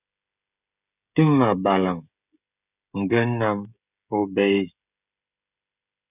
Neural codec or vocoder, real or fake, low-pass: codec, 16 kHz, 16 kbps, FreqCodec, smaller model; fake; 3.6 kHz